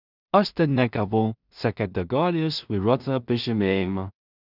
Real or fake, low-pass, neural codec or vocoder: fake; 5.4 kHz; codec, 16 kHz in and 24 kHz out, 0.4 kbps, LongCat-Audio-Codec, two codebook decoder